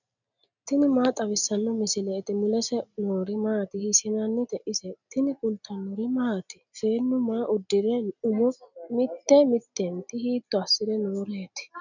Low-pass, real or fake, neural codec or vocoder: 7.2 kHz; real; none